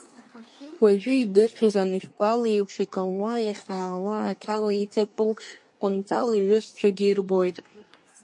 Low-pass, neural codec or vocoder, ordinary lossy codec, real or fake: 10.8 kHz; codec, 24 kHz, 1 kbps, SNAC; MP3, 48 kbps; fake